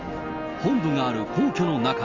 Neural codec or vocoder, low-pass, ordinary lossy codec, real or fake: none; 7.2 kHz; Opus, 32 kbps; real